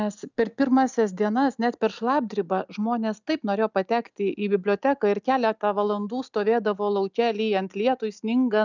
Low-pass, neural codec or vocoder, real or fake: 7.2 kHz; none; real